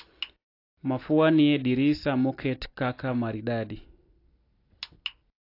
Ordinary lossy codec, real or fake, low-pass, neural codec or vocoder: AAC, 32 kbps; real; 5.4 kHz; none